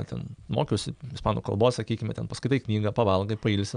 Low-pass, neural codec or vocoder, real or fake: 9.9 kHz; none; real